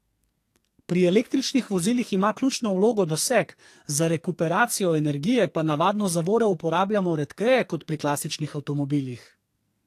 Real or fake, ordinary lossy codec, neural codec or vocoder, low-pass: fake; AAC, 64 kbps; codec, 44.1 kHz, 2.6 kbps, SNAC; 14.4 kHz